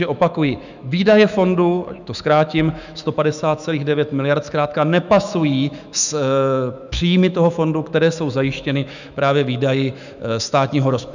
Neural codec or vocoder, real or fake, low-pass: autoencoder, 48 kHz, 128 numbers a frame, DAC-VAE, trained on Japanese speech; fake; 7.2 kHz